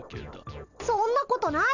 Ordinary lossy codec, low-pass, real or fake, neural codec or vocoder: none; 7.2 kHz; real; none